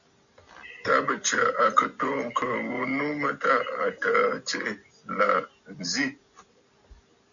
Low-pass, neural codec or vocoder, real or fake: 7.2 kHz; none; real